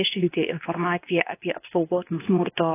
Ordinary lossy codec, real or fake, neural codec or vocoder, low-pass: AAC, 16 kbps; fake; codec, 24 kHz, 0.9 kbps, WavTokenizer, medium speech release version 2; 3.6 kHz